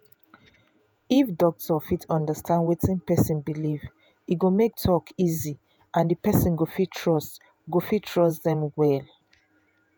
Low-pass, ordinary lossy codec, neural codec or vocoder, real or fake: none; none; vocoder, 48 kHz, 128 mel bands, Vocos; fake